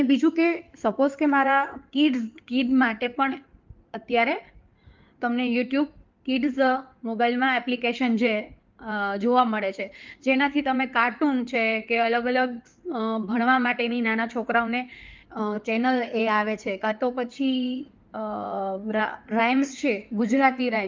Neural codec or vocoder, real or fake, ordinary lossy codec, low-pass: codec, 16 kHz in and 24 kHz out, 2.2 kbps, FireRedTTS-2 codec; fake; Opus, 24 kbps; 7.2 kHz